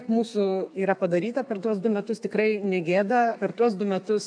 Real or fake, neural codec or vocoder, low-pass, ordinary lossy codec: fake; codec, 32 kHz, 1.9 kbps, SNAC; 9.9 kHz; AAC, 64 kbps